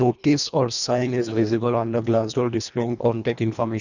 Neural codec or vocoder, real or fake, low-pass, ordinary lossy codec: codec, 24 kHz, 1.5 kbps, HILCodec; fake; 7.2 kHz; none